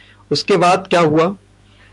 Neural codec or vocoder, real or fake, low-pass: autoencoder, 48 kHz, 128 numbers a frame, DAC-VAE, trained on Japanese speech; fake; 10.8 kHz